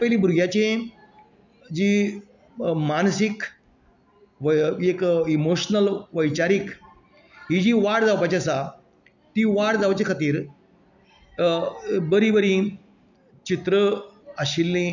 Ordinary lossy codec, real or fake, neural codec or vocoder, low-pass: none; real; none; 7.2 kHz